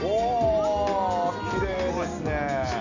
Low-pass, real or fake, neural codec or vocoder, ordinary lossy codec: 7.2 kHz; real; none; none